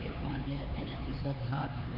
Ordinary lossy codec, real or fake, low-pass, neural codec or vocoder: none; fake; 5.4 kHz; codec, 16 kHz, 4 kbps, X-Codec, HuBERT features, trained on LibriSpeech